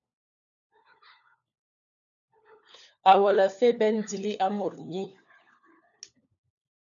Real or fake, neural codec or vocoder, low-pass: fake; codec, 16 kHz, 4 kbps, FunCodec, trained on LibriTTS, 50 frames a second; 7.2 kHz